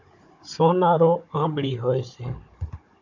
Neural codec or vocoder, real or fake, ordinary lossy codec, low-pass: codec, 16 kHz, 16 kbps, FunCodec, trained on Chinese and English, 50 frames a second; fake; AAC, 48 kbps; 7.2 kHz